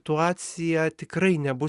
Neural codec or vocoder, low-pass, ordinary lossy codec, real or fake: none; 10.8 kHz; Opus, 64 kbps; real